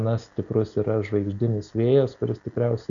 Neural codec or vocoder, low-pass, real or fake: none; 7.2 kHz; real